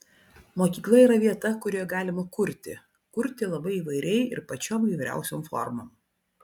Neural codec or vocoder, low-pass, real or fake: none; 19.8 kHz; real